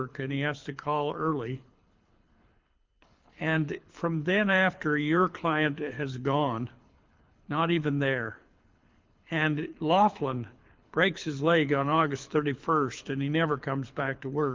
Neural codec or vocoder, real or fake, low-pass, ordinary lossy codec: codec, 24 kHz, 6 kbps, HILCodec; fake; 7.2 kHz; Opus, 32 kbps